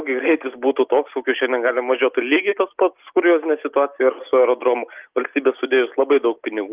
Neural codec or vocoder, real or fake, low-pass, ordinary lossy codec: none; real; 3.6 kHz; Opus, 32 kbps